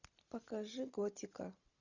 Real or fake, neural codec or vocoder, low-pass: real; none; 7.2 kHz